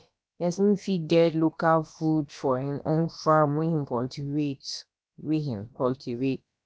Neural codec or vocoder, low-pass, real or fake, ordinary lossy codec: codec, 16 kHz, about 1 kbps, DyCAST, with the encoder's durations; none; fake; none